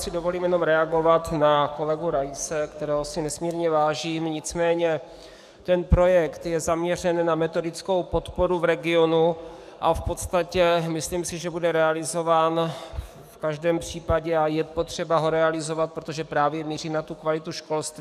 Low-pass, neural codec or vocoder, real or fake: 14.4 kHz; codec, 44.1 kHz, 7.8 kbps, DAC; fake